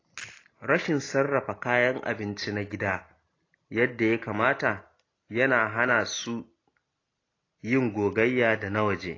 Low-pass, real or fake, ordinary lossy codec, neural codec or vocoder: 7.2 kHz; real; AAC, 32 kbps; none